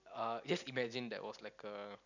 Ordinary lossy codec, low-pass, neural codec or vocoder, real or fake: none; 7.2 kHz; none; real